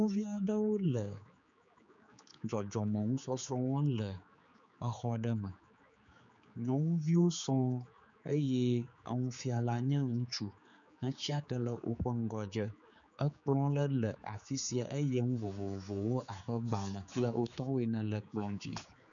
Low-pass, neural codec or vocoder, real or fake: 7.2 kHz; codec, 16 kHz, 4 kbps, X-Codec, HuBERT features, trained on general audio; fake